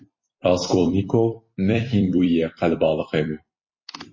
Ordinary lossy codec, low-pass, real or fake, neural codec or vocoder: MP3, 32 kbps; 7.2 kHz; fake; vocoder, 44.1 kHz, 128 mel bands every 256 samples, BigVGAN v2